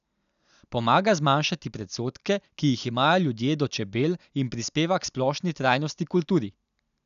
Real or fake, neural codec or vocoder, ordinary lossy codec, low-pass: real; none; none; 7.2 kHz